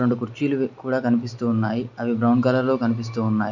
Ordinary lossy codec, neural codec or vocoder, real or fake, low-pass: none; none; real; 7.2 kHz